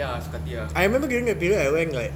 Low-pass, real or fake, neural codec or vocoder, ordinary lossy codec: 19.8 kHz; real; none; none